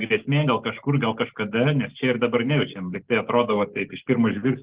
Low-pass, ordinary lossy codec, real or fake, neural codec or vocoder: 3.6 kHz; Opus, 16 kbps; real; none